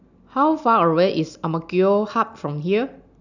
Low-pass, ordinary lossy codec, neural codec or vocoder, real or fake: 7.2 kHz; none; none; real